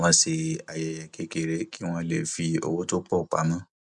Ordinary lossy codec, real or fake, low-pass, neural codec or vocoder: none; real; 10.8 kHz; none